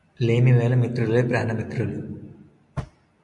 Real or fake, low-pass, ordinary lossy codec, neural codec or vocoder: real; 10.8 kHz; MP3, 64 kbps; none